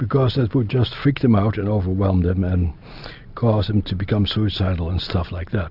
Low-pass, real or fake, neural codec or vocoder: 5.4 kHz; real; none